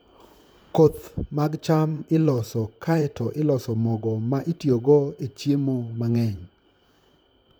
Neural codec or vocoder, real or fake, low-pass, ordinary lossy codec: vocoder, 44.1 kHz, 128 mel bands, Pupu-Vocoder; fake; none; none